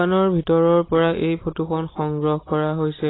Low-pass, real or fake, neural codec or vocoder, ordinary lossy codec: 7.2 kHz; real; none; AAC, 16 kbps